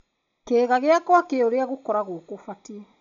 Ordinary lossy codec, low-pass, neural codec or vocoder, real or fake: none; 7.2 kHz; none; real